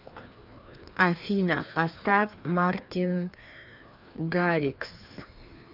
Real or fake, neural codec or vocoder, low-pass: fake; codec, 16 kHz, 2 kbps, FreqCodec, larger model; 5.4 kHz